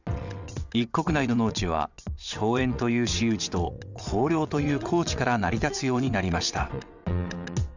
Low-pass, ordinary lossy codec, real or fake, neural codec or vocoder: 7.2 kHz; none; fake; vocoder, 22.05 kHz, 80 mel bands, WaveNeXt